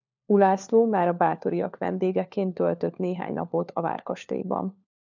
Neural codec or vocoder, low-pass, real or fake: codec, 16 kHz, 4 kbps, FunCodec, trained on LibriTTS, 50 frames a second; 7.2 kHz; fake